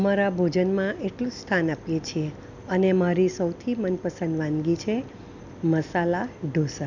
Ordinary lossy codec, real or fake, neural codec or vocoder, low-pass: none; real; none; 7.2 kHz